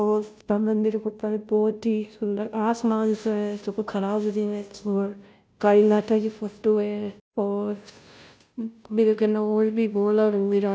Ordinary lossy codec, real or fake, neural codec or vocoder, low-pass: none; fake; codec, 16 kHz, 0.5 kbps, FunCodec, trained on Chinese and English, 25 frames a second; none